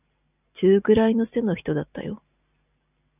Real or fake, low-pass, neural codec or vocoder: real; 3.6 kHz; none